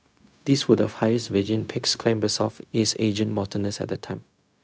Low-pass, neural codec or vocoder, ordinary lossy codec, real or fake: none; codec, 16 kHz, 0.4 kbps, LongCat-Audio-Codec; none; fake